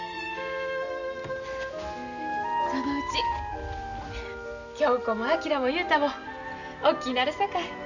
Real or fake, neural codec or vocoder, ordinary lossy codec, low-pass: real; none; none; 7.2 kHz